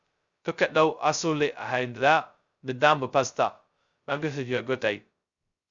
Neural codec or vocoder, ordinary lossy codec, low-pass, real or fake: codec, 16 kHz, 0.2 kbps, FocalCodec; Opus, 64 kbps; 7.2 kHz; fake